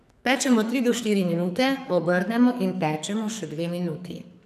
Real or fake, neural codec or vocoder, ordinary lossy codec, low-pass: fake; codec, 44.1 kHz, 2.6 kbps, SNAC; none; 14.4 kHz